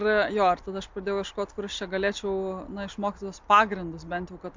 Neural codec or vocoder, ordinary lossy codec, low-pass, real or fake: none; MP3, 64 kbps; 7.2 kHz; real